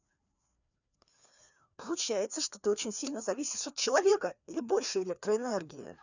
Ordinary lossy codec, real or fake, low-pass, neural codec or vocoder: none; fake; 7.2 kHz; codec, 16 kHz, 2 kbps, FreqCodec, larger model